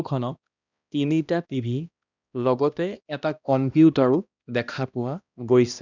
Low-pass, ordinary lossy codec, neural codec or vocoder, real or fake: 7.2 kHz; none; codec, 16 kHz, 1 kbps, X-Codec, HuBERT features, trained on balanced general audio; fake